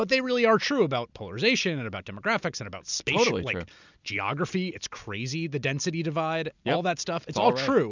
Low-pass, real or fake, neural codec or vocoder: 7.2 kHz; real; none